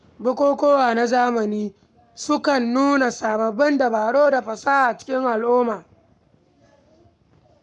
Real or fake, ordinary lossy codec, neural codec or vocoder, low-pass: fake; none; codec, 44.1 kHz, 7.8 kbps, Pupu-Codec; 10.8 kHz